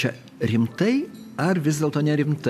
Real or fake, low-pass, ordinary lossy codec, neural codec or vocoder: real; 14.4 kHz; AAC, 96 kbps; none